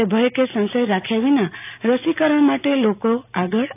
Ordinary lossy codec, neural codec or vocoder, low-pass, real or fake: AAC, 32 kbps; none; 3.6 kHz; real